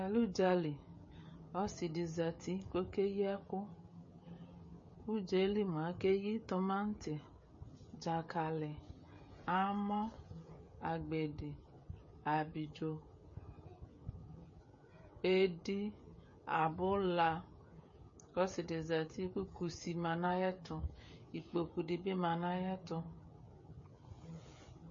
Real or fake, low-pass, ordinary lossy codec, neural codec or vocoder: fake; 7.2 kHz; MP3, 32 kbps; codec, 16 kHz, 16 kbps, FreqCodec, smaller model